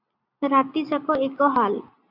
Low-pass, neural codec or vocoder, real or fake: 5.4 kHz; none; real